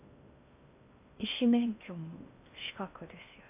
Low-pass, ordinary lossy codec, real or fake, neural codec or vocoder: 3.6 kHz; AAC, 32 kbps; fake; codec, 16 kHz in and 24 kHz out, 0.6 kbps, FocalCodec, streaming, 2048 codes